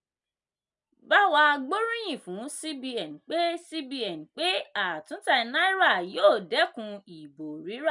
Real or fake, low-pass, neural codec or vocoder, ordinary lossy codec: real; 10.8 kHz; none; none